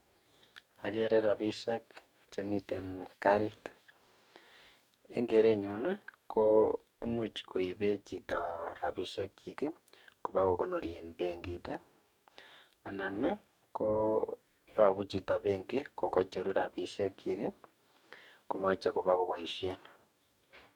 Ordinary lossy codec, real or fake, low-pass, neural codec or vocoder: none; fake; 19.8 kHz; codec, 44.1 kHz, 2.6 kbps, DAC